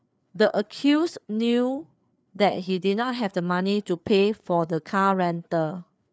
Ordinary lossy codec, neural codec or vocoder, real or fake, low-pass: none; codec, 16 kHz, 4 kbps, FreqCodec, larger model; fake; none